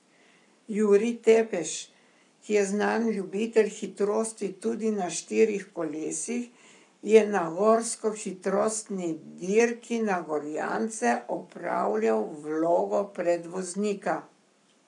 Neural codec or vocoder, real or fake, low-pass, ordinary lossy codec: codec, 44.1 kHz, 7.8 kbps, Pupu-Codec; fake; 10.8 kHz; none